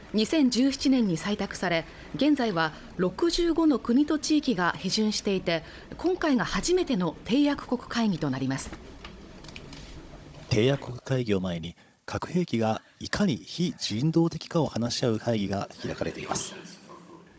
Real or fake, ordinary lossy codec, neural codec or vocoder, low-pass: fake; none; codec, 16 kHz, 16 kbps, FunCodec, trained on Chinese and English, 50 frames a second; none